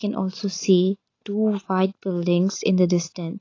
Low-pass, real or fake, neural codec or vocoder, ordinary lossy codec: 7.2 kHz; real; none; AAC, 32 kbps